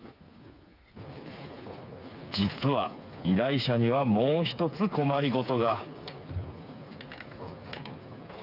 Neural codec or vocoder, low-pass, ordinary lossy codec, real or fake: codec, 16 kHz, 4 kbps, FreqCodec, smaller model; 5.4 kHz; none; fake